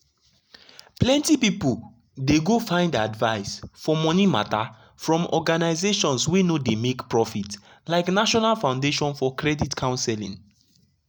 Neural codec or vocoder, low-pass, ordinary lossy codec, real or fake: none; none; none; real